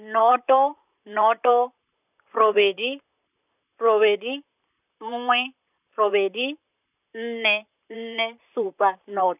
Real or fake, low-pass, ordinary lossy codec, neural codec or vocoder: fake; 3.6 kHz; none; vocoder, 44.1 kHz, 128 mel bands, Pupu-Vocoder